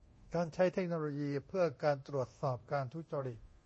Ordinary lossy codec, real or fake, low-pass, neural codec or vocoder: MP3, 32 kbps; fake; 10.8 kHz; codec, 24 kHz, 0.9 kbps, DualCodec